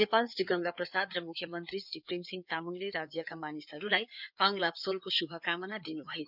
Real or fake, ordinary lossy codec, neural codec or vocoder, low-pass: fake; none; codec, 16 kHz in and 24 kHz out, 2.2 kbps, FireRedTTS-2 codec; 5.4 kHz